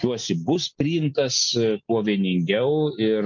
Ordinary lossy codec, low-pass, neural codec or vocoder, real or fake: MP3, 64 kbps; 7.2 kHz; none; real